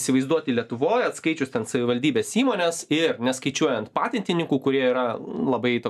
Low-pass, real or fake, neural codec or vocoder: 14.4 kHz; real; none